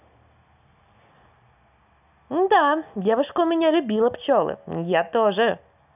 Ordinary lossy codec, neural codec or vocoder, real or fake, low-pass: none; none; real; 3.6 kHz